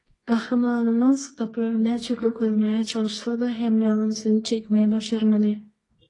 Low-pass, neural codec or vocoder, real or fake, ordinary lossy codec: 10.8 kHz; codec, 24 kHz, 0.9 kbps, WavTokenizer, medium music audio release; fake; AAC, 32 kbps